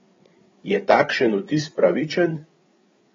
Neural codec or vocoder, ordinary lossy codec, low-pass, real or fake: codec, 16 kHz, 8 kbps, FreqCodec, larger model; AAC, 24 kbps; 7.2 kHz; fake